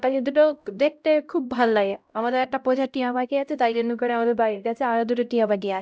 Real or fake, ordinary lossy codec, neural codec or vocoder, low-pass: fake; none; codec, 16 kHz, 0.5 kbps, X-Codec, HuBERT features, trained on LibriSpeech; none